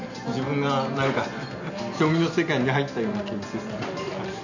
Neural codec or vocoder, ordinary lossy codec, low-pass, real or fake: none; none; 7.2 kHz; real